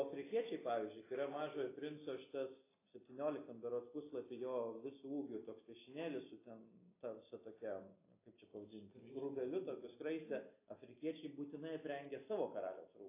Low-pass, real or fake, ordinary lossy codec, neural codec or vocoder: 3.6 kHz; real; AAC, 24 kbps; none